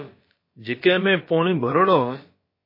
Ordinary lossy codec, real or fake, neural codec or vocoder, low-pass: MP3, 24 kbps; fake; codec, 16 kHz, about 1 kbps, DyCAST, with the encoder's durations; 5.4 kHz